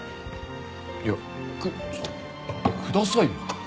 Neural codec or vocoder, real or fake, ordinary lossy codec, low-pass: none; real; none; none